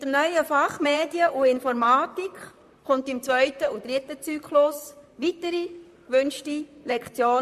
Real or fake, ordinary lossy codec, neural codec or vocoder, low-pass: fake; MP3, 96 kbps; vocoder, 44.1 kHz, 128 mel bands, Pupu-Vocoder; 14.4 kHz